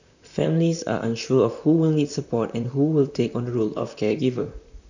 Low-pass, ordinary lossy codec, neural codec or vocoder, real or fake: 7.2 kHz; none; vocoder, 44.1 kHz, 128 mel bands, Pupu-Vocoder; fake